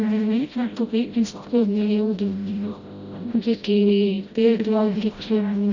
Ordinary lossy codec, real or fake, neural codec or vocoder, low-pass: Opus, 64 kbps; fake; codec, 16 kHz, 0.5 kbps, FreqCodec, smaller model; 7.2 kHz